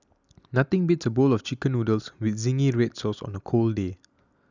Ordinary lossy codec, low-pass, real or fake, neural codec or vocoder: none; 7.2 kHz; real; none